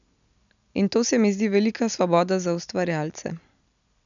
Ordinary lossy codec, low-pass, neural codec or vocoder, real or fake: none; 7.2 kHz; none; real